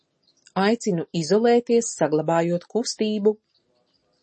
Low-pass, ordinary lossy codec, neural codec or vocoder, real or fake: 10.8 kHz; MP3, 32 kbps; none; real